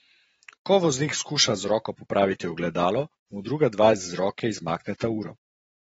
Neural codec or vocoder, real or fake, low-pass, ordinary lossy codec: vocoder, 44.1 kHz, 128 mel bands every 512 samples, BigVGAN v2; fake; 19.8 kHz; AAC, 24 kbps